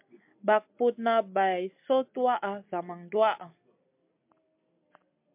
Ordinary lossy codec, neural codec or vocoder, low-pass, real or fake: MP3, 32 kbps; none; 3.6 kHz; real